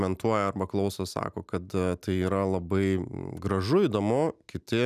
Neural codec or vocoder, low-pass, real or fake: none; 14.4 kHz; real